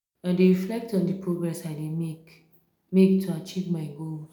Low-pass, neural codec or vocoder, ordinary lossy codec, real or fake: 19.8 kHz; none; none; real